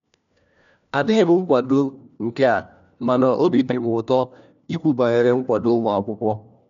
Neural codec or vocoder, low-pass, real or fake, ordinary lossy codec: codec, 16 kHz, 1 kbps, FunCodec, trained on LibriTTS, 50 frames a second; 7.2 kHz; fake; MP3, 96 kbps